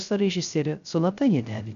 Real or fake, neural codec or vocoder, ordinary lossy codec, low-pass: fake; codec, 16 kHz, 0.3 kbps, FocalCodec; AAC, 96 kbps; 7.2 kHz